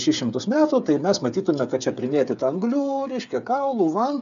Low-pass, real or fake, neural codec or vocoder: 7.2 kHz; fake; codec, 16 kHz, 8 kbps, FreqCodec, smaller model